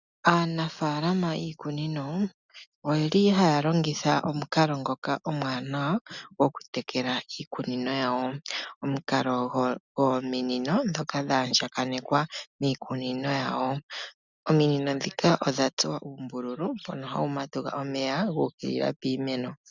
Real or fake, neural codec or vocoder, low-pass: real; none; 7.2 kHz